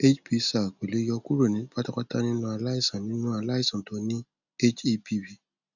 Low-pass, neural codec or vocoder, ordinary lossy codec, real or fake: 7.2 kHz; none; none; real